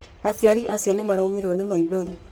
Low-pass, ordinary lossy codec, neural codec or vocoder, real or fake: none; none; codec, 44.1 kHz, 1.7 kbps, Pupu-Codec; fake